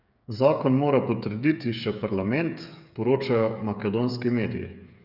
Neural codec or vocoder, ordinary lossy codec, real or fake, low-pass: codec, 16 kHz, 8 kbps, FreqCodec, smaller model; none; fake; 5.4 kHz